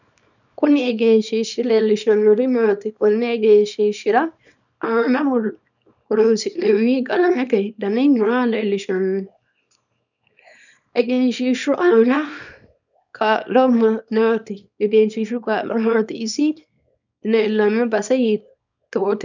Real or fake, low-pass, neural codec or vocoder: fake; 7.2 kHz; codec, 24 kHz, 0.9 kbps, WavTokenizer, small release